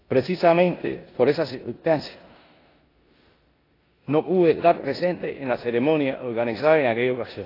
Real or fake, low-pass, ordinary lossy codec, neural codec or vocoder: fake; 5.4 kHz; AAC, 24 kbps; codec, 16 kHz in and 24 kHz out, 0.9 kbps, LongCat-Audio-Codec, four codebook decoder